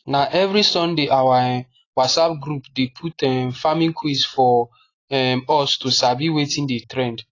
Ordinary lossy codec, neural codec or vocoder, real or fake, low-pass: AAC, 32 kbps; none; real; 7.2 kHz